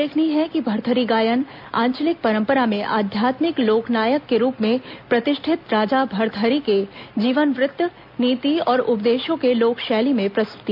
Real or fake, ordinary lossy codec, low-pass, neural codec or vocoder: real; none; 5.4 kHz; none